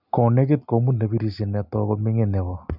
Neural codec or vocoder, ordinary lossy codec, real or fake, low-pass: none; none; real; 5.4 kHz